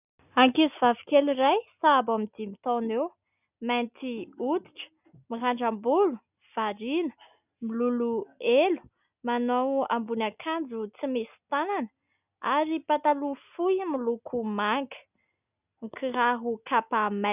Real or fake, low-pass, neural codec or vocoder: real; 3.6 kHz; none